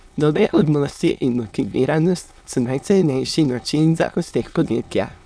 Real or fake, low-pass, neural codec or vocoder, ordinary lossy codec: fake; none; autoencoder, 22.05 kHz, a latent of 192 numbers a frame, VITS, trained on many speakers; none